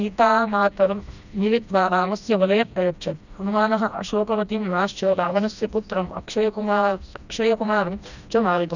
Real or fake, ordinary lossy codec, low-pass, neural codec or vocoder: fake; none; 7.2 kHz; codec, 16 kHz, 1 kbps, FreqCodec, smaller model